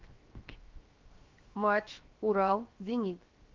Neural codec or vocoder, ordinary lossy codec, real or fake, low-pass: codec, 16 kHz, 0.7 kbps, FocalCodec; Opus, 32 kbps; fake; 7.2 kHz